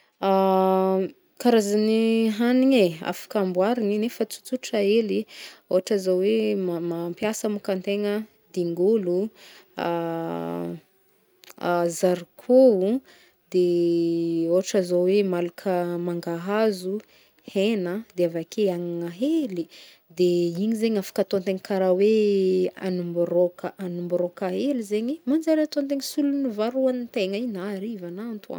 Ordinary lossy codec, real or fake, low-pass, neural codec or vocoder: none; real; none; none